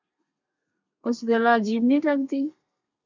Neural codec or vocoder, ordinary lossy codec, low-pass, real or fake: codec, 32 kHz, 1.9 kbps, SNAC; MP3, 64 kbps; 7.2 kHz; fake